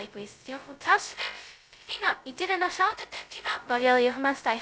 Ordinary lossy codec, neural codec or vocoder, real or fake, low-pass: none; codec, 16 kHz, 0.2 kbps, FocalCodec; fake; none